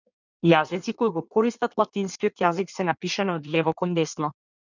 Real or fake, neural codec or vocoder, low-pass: fake; codec, 16 kHz in and 24 kHz out, 1.1 kbps, FireRedTTS-2 codec; 7.2 kHz